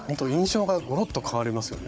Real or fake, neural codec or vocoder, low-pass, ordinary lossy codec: fake; codec, 16 kHz, 16 kbps, FunCodec, trained on Chinese and English, 50 frames a second; none; none